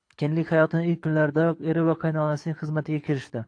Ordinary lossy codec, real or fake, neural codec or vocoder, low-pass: AAC, 48 kbps; fake; codec, 24 kHz, 6 kbps, HILCodec; 9.9 kHz